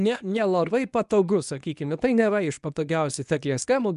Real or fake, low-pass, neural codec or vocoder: fake; 10.8 kHz; codec, 24 kHz, 0.9 kbps, WavTokenizer, medium speech release version 1